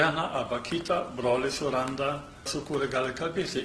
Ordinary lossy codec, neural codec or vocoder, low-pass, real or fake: Opus, 16 kbps; none; 10.8 kHz; real